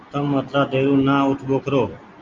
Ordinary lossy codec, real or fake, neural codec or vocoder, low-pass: Opus, 32 kbps; real; none; 7.2 kHz